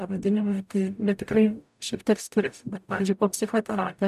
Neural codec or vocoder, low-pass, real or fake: codec, 44.1 kHz, 0.9 kbps, DAC; 14.4 kHz; fake